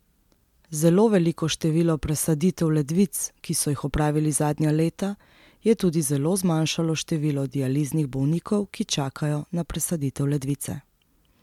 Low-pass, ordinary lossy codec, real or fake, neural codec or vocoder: 19.8 kHz; MP3, 96 kbps; real; none